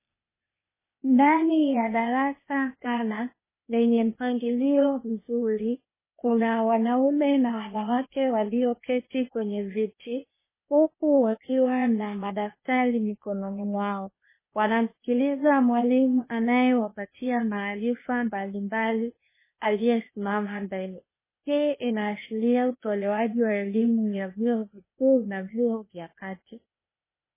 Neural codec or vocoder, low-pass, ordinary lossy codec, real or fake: codec, 16 kHz, 0.8 kbps, ZipCodec; 3.6 kHz; MP3, 16 kbps; fake